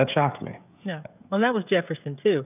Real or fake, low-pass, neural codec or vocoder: fake; 3.6 kHz; codec, 16 kHz, 16 kbps, FreqCodec, smaller model